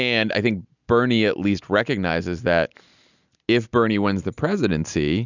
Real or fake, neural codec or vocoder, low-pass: real; none; 7.2 kHz